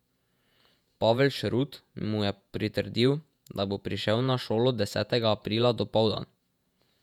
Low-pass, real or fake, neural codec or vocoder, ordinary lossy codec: 19.8 kHz; fake; vocoder, 48 kHz, 128 mel bands, Vocos; none